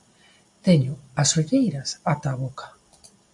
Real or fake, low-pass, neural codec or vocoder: real; 10.8 kHz; none